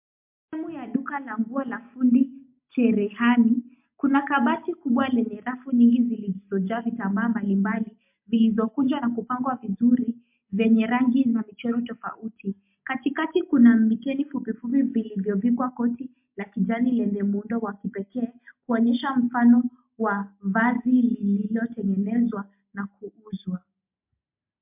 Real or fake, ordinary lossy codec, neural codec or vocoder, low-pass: real; MP3, 32 kbps; none; 3.6 kHz